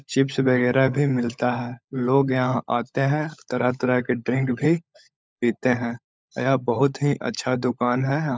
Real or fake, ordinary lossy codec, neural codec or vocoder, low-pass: fake; none; codec, 16 kHz, 16 kbps, FunCodec, trained on LibriTTS, 50 frames a second; none